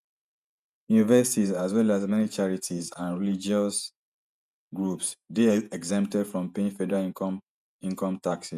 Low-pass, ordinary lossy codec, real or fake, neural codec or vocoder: 14.4 kHz; none; fake; vocoder, 48 kHz, 128 mel bands, Vocos